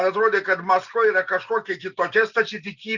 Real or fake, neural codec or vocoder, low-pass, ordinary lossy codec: real; none; 7.2 kHz; Opus, 64 kbps